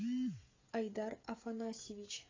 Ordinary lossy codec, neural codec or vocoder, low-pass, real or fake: AAC, 32 kbps; codec, 16 kHz, 8 kbps, FreqCodec, smaller model; 7.2 kHz; fake